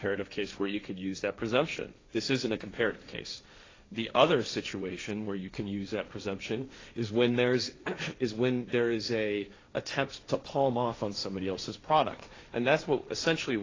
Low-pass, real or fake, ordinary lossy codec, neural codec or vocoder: 7.2 kHz; fake; AAC, 32 kbps; codec, 16 kHz, 1.1 kbps, Voila-Tokenizer